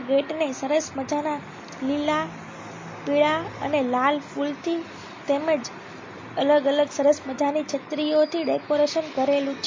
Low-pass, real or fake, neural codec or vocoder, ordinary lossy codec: 7.2 kHz; real; none; MP3, 32 kbps